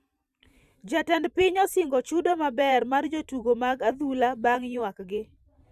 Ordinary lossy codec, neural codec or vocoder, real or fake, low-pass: none; vocoder, 44.1 kHz, 128 mel bands every 512 samples, BigVGAN v2; fake; 14.4 kHz